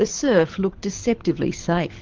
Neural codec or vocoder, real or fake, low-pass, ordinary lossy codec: codec, 24 kHz, 6 kbps, HILCodec; fake; 7.2 kHz; Opus, 32 kbps